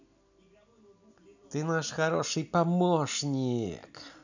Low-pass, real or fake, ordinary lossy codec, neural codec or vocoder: 7.2 kHz; real; none; none